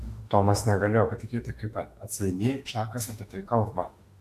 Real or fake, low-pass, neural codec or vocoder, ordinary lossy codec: fake; 14.4 kHz; autoencoder, 48 kHz, 32 numbers a frame, DAC-VAE, trained on Japanese speech; AAC, 64 kbps